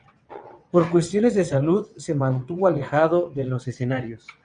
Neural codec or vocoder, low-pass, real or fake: vocoder, 22.05 kHz, 80 mel bands, WaveNeXt; 9.9 kHz; fake